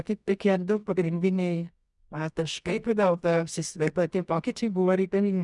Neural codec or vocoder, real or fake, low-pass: codec, 24 kHz, 0.9 kbps, WavTokenizer, medium music audio release; fake; 10.8 kHz